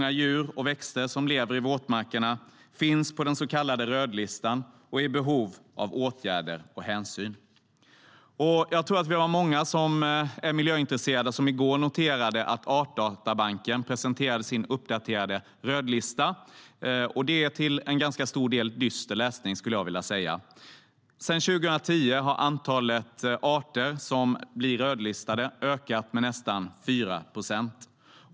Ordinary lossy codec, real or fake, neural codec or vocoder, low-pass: none; real; none; none